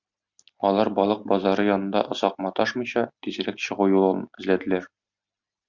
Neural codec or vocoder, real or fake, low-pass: none; real; 7.2 kHz